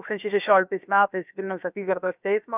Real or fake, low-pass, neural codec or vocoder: fake; 3.6 kHz; codec, 16 kHz, about 1 kbps, DyCAST, with the encoder's durations